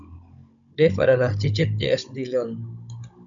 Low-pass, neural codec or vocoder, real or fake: 7.2 kHz; codec, 16 kHz, 16 kbps, FunCodec, trained on Chinese and English, 50 frames a second; fake